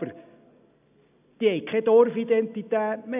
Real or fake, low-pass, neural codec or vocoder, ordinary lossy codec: real; 3.6 kHz; none; AAC, 32 kbps